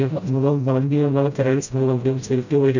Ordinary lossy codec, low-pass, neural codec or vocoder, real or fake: none; 7.2 kHz; codec, 16 kHz, 0.5 kbps, FreqCodec, smaller model; fake